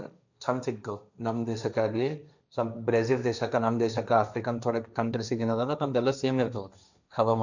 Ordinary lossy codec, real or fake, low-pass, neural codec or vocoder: none; fake; 7.2 kHz; codec, 16 kHz, 1.1 kbps, Voila-Tokenizer